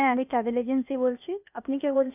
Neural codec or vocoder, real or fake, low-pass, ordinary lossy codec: codec, 16 kHz, 0.8 kbps, ZipCodec; fake; 3.6 kHz; none